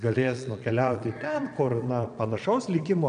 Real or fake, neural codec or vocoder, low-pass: fake; vocoder, 22.05 kHz, 80 mel bands, Vocos; 9.9 kHz